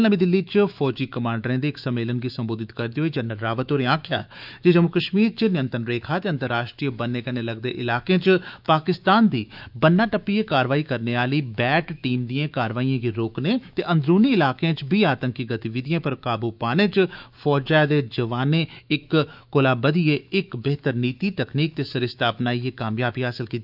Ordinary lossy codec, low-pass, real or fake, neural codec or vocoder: none; 5.4 kHz; fake; autoencoder, 48 kHz, 128 numbers a frame, DAC-VAE, trained on Japanese speech